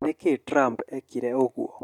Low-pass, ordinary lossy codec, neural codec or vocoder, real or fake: 19.8 kHz; MP3, 96 kbps; none; real